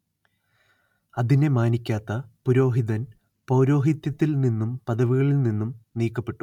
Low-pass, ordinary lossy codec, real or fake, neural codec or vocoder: 19.8 kHz; none; real; none